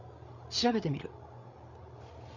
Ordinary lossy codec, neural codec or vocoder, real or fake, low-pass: none; codec, 16 kHz, 8 kbps, FreqCodec, larger model; fake; 7.2 kHz